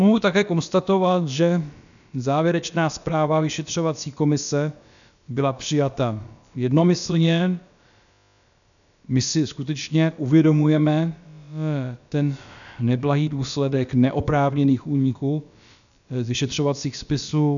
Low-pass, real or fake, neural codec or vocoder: 7.2 kHz; fake; codec, 16 kHz, about 1 kbps, DyCAST, with the encoder's durations